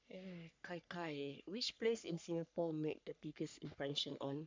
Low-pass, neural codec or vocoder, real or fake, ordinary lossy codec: 7.2 kHz; codec, 44.1 kHz, 3.4 kbps, Pupu-Codec; fake; MP3, 64 kbps